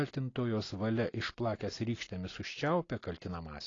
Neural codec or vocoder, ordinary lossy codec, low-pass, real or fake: none; AAC, 32 kbps; 7.2 kHz; real